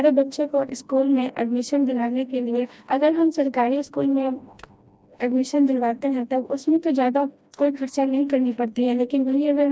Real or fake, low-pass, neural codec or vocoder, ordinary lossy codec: fake; none; codec, 16 kHz, 1 kbps, FreqCodec, smaller model; none